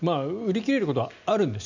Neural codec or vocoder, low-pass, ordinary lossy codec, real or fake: none; 7.2 kHz; none; real